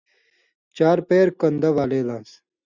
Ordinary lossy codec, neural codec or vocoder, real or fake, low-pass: Opus, 64 kbps; none; real; 7.2 kHz